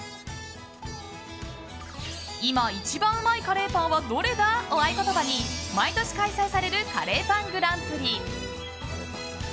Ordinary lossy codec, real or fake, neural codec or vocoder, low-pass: none; real; none; none